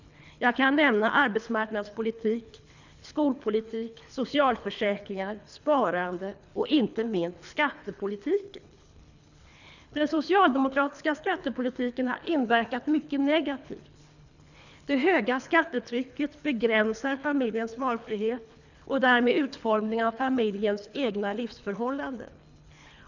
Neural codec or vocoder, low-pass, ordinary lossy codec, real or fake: codec, 24 kHz, 3 kbps, HILCodec; 7.2 kHz; none; fake